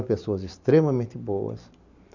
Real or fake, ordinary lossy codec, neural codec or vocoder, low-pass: fake; none; vocoder, 44.1 kHz, 128 mel bands every 512 samples, BigVGAN v2; 7.2 kHz